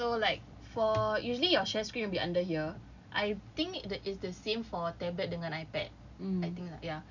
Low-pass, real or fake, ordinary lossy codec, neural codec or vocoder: 7.2 kHz; real; none; none